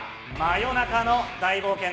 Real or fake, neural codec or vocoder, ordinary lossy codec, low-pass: real; none; none; none